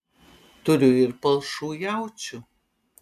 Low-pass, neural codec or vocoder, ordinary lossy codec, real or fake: 14.4 kHz; none; AAC, 96 kbps; real